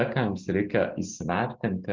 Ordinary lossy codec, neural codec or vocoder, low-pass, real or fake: Opus, 24 kbps; none; 7.2 kHz; real